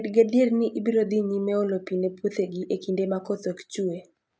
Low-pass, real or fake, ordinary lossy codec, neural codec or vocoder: none; real; none; none